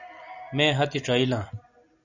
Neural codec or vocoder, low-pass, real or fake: none; 7.2 kHz; real